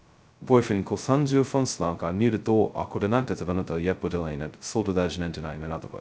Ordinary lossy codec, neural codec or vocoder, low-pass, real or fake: none; codec, 16 kHz, 0.2 kbps, FocalCodec; none; fake